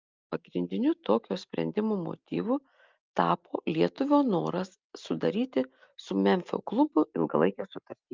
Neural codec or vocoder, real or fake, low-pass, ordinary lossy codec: none; real; 7.2 kHz; Opus, 24 kbps